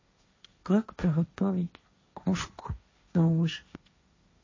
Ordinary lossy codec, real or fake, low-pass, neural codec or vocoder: MP3, 32 kbps; fake; 7.2 kHz; codec, 16 kHz, 1.1 kbps, Voila-Tokenizer